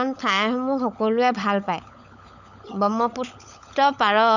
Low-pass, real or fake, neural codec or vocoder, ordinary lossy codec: 7.2 kHz; fake; codec, 16 kHz, 16 kbps, FunCodec, trained on LibriTTS, 50 frames a second; none